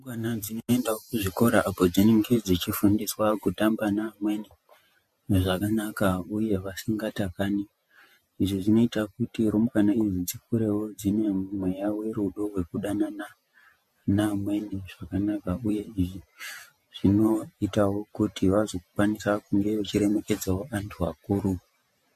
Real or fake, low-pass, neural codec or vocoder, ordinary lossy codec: fake; 14.4 kHz; vocoder, 48 kHz, 128 mel bands, Vocos; MP3, 64 kbps